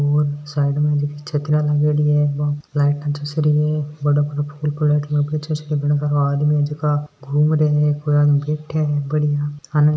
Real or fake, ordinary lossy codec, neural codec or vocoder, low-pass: real; none; none; none